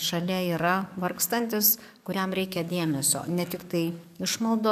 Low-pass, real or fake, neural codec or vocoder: 14.4 kHz; fake; codec, 44.1 kHz, 7.8 kbps, DAC